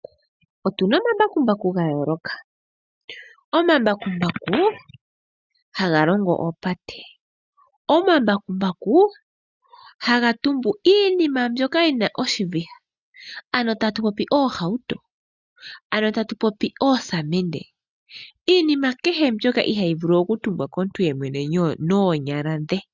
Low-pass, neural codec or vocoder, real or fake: 7.2 kHz; none; real